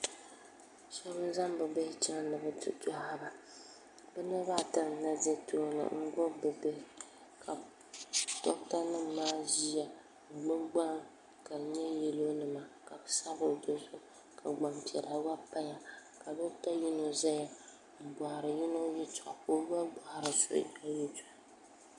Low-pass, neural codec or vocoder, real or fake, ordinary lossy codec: 10.8 kHz; none; real; MP3, 96 kbps